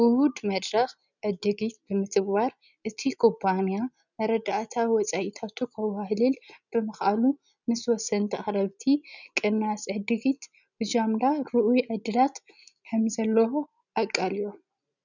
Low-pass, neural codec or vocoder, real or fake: 7.2 kHz; none; real